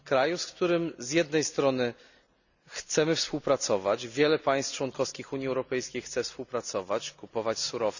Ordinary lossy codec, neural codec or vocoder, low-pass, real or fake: none; none; 7.2 kHz; real